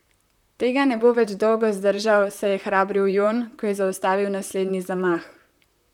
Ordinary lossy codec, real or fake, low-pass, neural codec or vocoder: none; fake; 19.8 kHz; vocoder, 44.1 kHz, 128 mel bands, Pupu-Vocoder